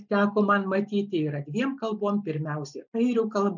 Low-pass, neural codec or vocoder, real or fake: 7.2 kHz; none; real